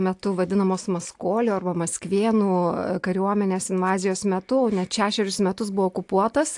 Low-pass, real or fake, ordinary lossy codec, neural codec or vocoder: 10.8 kHz; real; AAC, 64 kbps; none